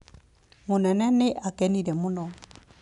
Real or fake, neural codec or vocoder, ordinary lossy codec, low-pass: real; none; none; 10.8 kHz